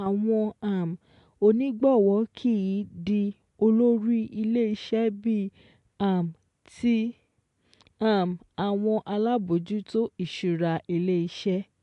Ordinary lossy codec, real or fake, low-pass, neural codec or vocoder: AAC, 64 kbps; real; 10.8 kHz; none